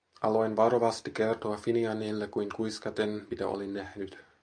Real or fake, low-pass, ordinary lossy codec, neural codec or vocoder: real; 9.9 kHz; MP3, 64 kbps; none